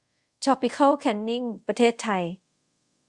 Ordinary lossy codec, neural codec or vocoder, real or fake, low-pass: Opus, 64 kbps; codec, 24 kHz, 0.5 kbps, DualCodec; fake; 10.8 kHz